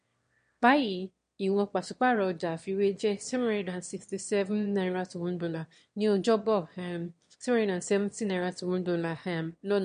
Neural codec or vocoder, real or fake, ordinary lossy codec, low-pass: autoencoder, 22.05 kHz, a latent of 192 numbers a frame, VITS, trained on one speaker; fake; MP3, 48 kbps; 9.9 kHz